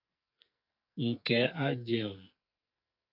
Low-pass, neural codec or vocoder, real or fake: 5.4 kHz; codec, 44.1 kHz, 2.6 kbps, SNAC; fake